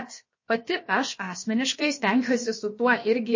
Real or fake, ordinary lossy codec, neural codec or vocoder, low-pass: fake; MP3, 32 kbps; codec, 16 kHz, about 1 kbps, DyCAST, with the encoder's durations; 7.2 kHz